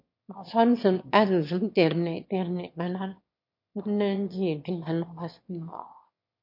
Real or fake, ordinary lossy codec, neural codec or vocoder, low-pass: fake; MP3, 32 kbps; autoencoder, 22.05 kHz, a latent of 192 numbers a frame, VITS, trained on one speaker; 5.4 kHz